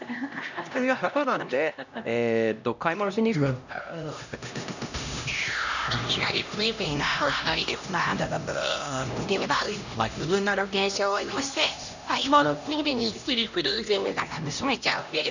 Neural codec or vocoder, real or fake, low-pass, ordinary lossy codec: codec, 16 kHz, 1 kbps, X-Codec, HuBERT features, trained on LibriSpeech; fake; 7.2 kHz; none